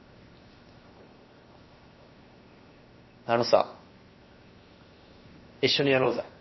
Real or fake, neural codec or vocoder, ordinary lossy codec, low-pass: fake; codec, 16 kHz, 0.7 kbps, FocalCodec; MP3, 24 kbps; 7.2 kHz